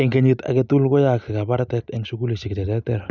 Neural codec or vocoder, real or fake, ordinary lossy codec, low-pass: none; real; none; 7.2 kHz